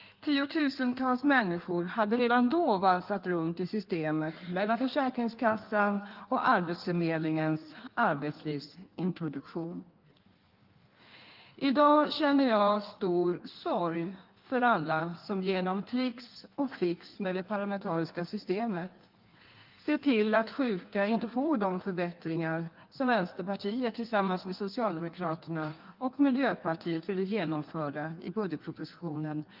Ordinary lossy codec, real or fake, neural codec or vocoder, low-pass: Opus, 32 kbps; fake; codec, 16 kHz in and 24 kHz out, 1.1 kbps, FireRedTTS-2 codec; 5.4 kHz